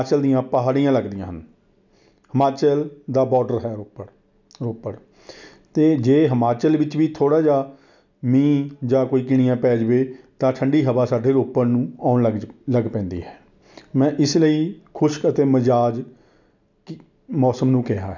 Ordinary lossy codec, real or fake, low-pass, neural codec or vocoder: none; real; 7.2 kHz; none